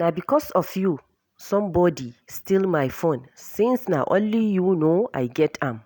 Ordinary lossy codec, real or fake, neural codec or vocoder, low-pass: none; real; none; none